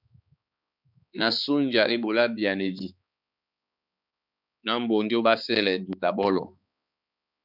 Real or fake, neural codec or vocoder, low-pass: fake; codec, 16 kHz, 4 kbps, X-Codec, HuBERT features, trained on balanced general audio; 5.4 kHz